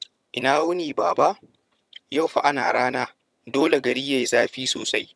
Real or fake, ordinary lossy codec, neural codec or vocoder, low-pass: fake; none; vocoder, 22.05 kHz, 80 mel bands, HiFi-GAN; none